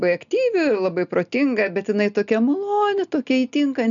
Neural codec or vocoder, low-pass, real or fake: none; 7.2 kHz; real